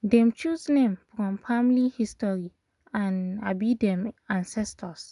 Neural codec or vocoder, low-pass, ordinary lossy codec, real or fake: none; 10.8 kHz; none; real